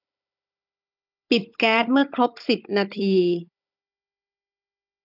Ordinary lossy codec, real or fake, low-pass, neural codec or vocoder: none; fake; 5.4 kHz; codec, 16 kHz, 16 kbps, FunCodec, trained on Chinese and English, 50 frames a second